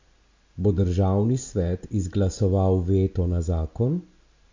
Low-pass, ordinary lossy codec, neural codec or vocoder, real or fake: 7.2 kHz; MP3, 48 kbps; none; real